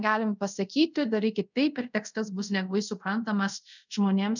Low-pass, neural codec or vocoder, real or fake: 7.2 kHz; codec, 24 kHz, 0.5 kbps, DualCodec; fake